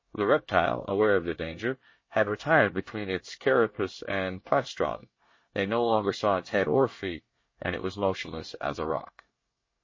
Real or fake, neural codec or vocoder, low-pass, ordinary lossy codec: fake; codec, 24 kHz, 1 kbps, SNAC; 7.2 kHz; MP3, 32 kbps